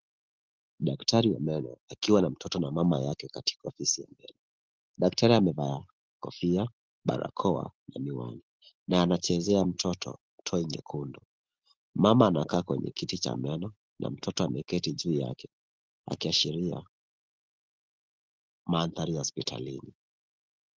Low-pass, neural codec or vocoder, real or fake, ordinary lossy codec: 7.2 kHz; none; real; Opus, 16 kbps